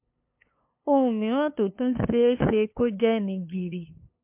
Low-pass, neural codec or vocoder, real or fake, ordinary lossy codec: 3.6 kHz; codec, 16 kHz, 2 kbps, FunCodec, trained on LibriTTS, 25 frames a second; fake; MP3, 32 kbps